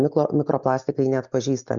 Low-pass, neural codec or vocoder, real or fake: 7.2 kHz; none; real